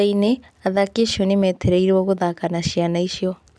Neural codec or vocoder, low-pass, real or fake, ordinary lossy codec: none; none; real; none